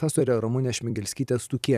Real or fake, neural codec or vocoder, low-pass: fake; vocoder, 44.1 kHz, 128 mel bands every 256 samples, BigVGAN v2; 14.4 kHz